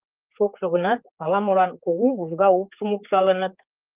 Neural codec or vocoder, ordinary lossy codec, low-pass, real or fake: codec, 16 kHz, 4 kbps, X-Codec, HuBERT features, trained on general audio; Opus, 24 kbps; 3.6 kHz; fake